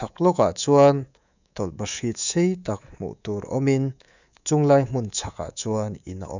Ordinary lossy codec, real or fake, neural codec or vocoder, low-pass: none; fake; codec, 24 kHz, 3.1 kbps, DualCodec; 7.2 kHz